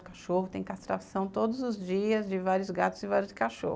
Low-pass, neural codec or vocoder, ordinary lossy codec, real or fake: none; none; none; real